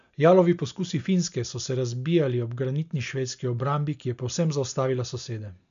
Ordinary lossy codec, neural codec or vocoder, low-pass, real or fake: MP3, 64 kbps; none; 7.2 kHz; real